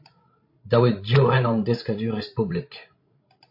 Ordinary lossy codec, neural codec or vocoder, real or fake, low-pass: MP3, 32 kbps; codec, 16 kHz, 16 kbps, FreqCodec, larger model; fake; 5.4 kHz